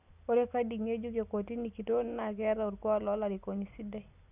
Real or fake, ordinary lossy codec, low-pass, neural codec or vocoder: real; none; 3.6 kHz; none